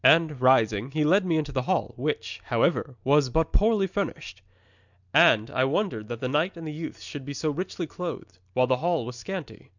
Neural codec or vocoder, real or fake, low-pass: none; real; 7.2 kHz